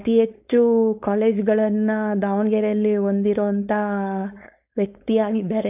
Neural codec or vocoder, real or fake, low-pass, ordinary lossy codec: codec, 16 kHz, 4.8 kbps, FACodec; fake; 3.6 kHz; AAC, 32 kbps